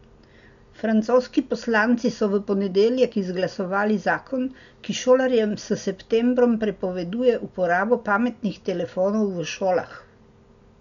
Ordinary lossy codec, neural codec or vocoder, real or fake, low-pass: none; none; real; 7.2 kHz